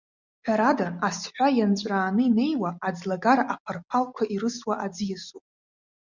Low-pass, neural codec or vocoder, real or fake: 7.2 kHz; none; real